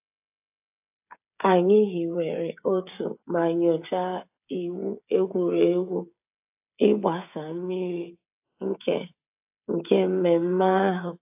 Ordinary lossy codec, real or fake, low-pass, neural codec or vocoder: AAC, 32 kbps; fake; 3.6 kHz; codec, 16 kHz, 8 kbps, FreqCodec, smaller model